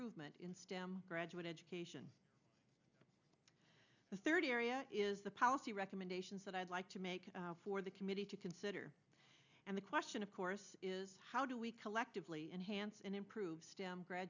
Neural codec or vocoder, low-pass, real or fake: none; 7.2 kHz; real